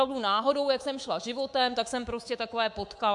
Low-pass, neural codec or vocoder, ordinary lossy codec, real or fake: 10.8 kHz; codec, 24 kHz, 3.1 kbps, DualCodec; MP3, 64 kbps; fake